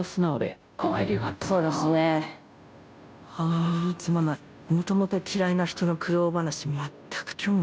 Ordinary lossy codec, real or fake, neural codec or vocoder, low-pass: none; fake; codec, 16 kHz, 0.5 kbps, FunCodec, trained on Chinese and English, 25 frames a second; none